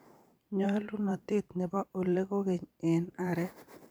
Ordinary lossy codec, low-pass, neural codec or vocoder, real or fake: none; none; vocoder, 44.1 kHz, 128 mel bands every 512 samples, BigVGAN v2; fake